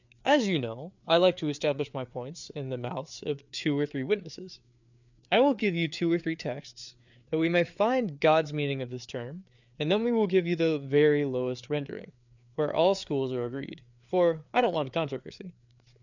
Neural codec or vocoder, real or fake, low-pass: codec, 16 kHz, 4 kbps, FreqCodec, larger model; fake; 7.2 kHz